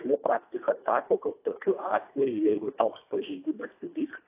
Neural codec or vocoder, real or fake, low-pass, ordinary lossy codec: codec, 24 kHz, 1.5 kbps, HILCodec; fake; 3.6 kHz; AAC, 24 kbps